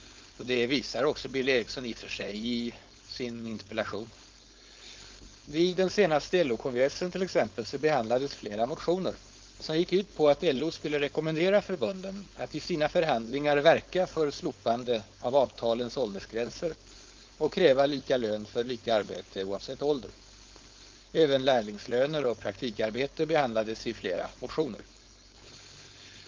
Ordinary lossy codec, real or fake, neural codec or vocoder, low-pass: Opus, 24 kbps; fake; codec, 16 kHz, 4.8 kbps, FACodec; 7.2 kHz